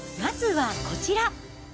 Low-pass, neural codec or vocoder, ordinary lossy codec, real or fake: none; none; none; real